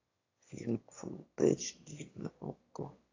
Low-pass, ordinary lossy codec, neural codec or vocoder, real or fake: 7.2 kHz; AAC, 32 kbps; autoencoder, 22.05 kHz, a latent of 192 numbers a frame, VITS, trained on one speaker; fake